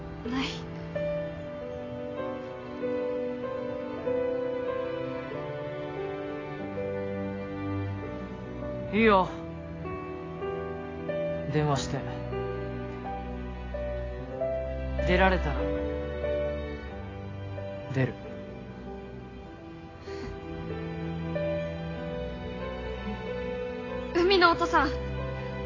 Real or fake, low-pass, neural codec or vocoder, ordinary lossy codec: real; 7.2 kHz; none; AAC, 32 kbps